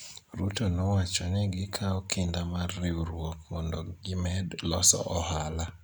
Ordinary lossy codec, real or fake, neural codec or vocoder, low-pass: none; real; none; none